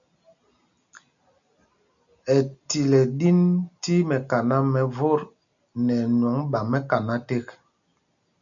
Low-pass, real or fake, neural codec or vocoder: 7.2 kHz; real; none